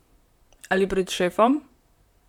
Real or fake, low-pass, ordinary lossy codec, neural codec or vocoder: fake; 19.8 kHz; none; vocoder, 44.1 kHz, 128 mel bands, Pupu-Vocoder